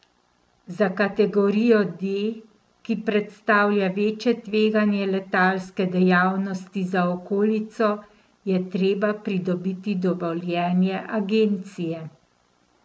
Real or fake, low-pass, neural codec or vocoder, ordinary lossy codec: real; none; none; none